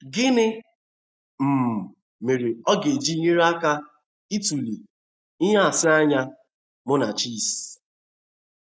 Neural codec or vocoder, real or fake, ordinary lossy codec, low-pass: none; real; none; none